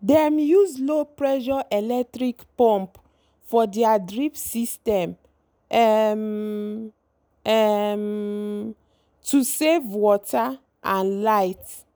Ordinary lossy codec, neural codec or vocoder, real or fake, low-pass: none; none; real; none